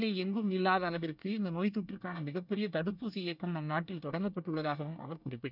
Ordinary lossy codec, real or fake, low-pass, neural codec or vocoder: none; fake; 5.4 kHz; codec, 24 kHz, 1 kbps, SNAC